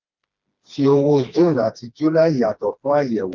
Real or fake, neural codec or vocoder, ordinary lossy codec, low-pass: fake; codec, 16 kHz, 2 kbps, FreqCodec, smaller model; Opus, 24 kbps; 7.2 kHz